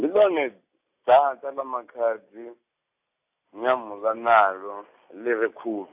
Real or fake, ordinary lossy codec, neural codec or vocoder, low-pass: real; AAC, 32 kbps; none; 3.6 kHz